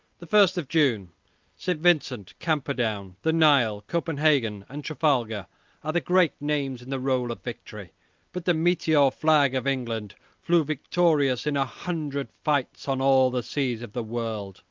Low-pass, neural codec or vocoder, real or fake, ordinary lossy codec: 7.2 kHz; none; real; Opus, 32 kbps